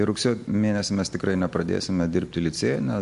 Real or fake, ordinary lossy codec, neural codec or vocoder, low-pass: real; MP3, 64 kbps; none; 10.8 kHz